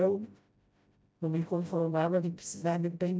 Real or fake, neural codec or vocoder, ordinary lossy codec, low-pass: fake; codec, 16 kHz, 0.5 kbps, FreqCodec, smaller model; none; none